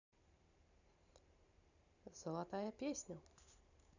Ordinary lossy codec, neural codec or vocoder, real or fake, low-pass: none; vocoder, 44.1 kHz, 128 mel bands every 512 samples, BigVGAN v2; fake; 7.2 kHz